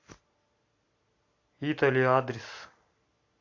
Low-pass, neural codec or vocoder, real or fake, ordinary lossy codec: 7.2 kHz; none; real; AAC, 48 kbps